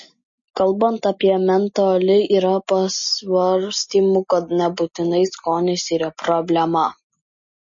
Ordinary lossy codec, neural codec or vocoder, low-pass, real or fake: MP3, 32 kbps; none; 7.2 kHz; real